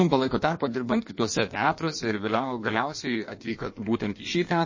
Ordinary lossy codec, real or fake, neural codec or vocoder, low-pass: MP3, 32 kbps; fake; codec, 16 kHz in and 24 kHz out, 1.1 kbps, FireRedTTS-2 codec; 7.2 kHz